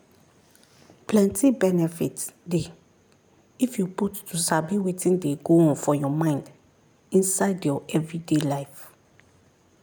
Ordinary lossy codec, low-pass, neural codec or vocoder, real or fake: none; none; none; real